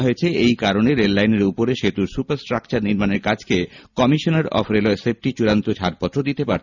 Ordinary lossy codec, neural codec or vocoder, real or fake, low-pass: none; none; real; 7.2 kHz